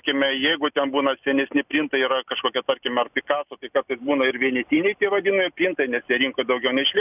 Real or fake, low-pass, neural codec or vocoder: real; 3.6 kHz; none